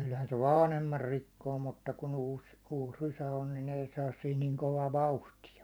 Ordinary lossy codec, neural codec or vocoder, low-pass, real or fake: none; vocoder, 44.1 kHz, 128 mel bands every 512 samples, BigVGAN v2; none; fake